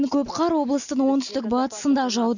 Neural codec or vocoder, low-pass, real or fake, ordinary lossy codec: none; 7.2 kHz; real; none